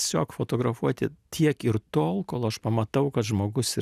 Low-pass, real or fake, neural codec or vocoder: 14.4 kHz; real; none